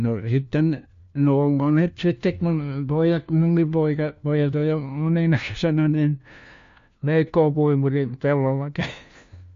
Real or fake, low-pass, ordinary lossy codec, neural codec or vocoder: fake; 7.2 kHz; MP3, 48 kbps; codec, 16 kHz, 1 kbps, FunCodec, trained on LibriTTS, 50 frames a second